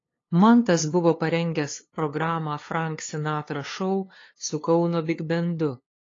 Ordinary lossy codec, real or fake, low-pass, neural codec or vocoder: AAC, 32 kbps; fake; 7.2 kHz; codec, 16 kHz, 2 kbps, FunCodec, trained on LibriTTS, 25 frames a second